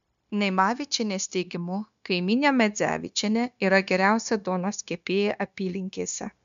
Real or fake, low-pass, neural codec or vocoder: fake; 7.2 kHz; codec, 16 kHz, 0.9 kbps, LongCat-Audio-Codec